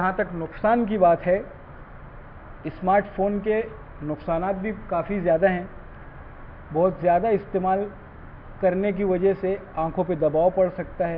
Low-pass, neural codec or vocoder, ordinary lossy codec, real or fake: 5.4 kHz; none; AAC, 48 kbps; real